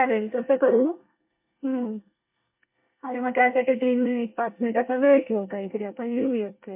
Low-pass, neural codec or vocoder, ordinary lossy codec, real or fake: 3.6 kHz; codec, 24 kHz, 1 kbps, SNAC; MP3, 32 kbps; fake